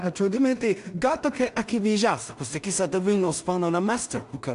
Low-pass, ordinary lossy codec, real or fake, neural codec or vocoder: 10.8 kHz; AAC, 96 kbps; fake; codec, 16 kHz in and 24 kHz out, 0.4 kbps, LongCat-Audio-Codec, two codebook decoder